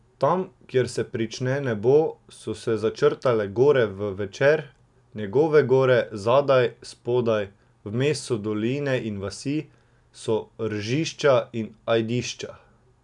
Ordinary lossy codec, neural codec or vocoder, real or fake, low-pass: none; none; real; 10.8 kHz